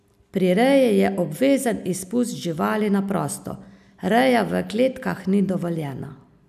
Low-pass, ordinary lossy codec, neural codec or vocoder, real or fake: 14.4 kHz; none; none; real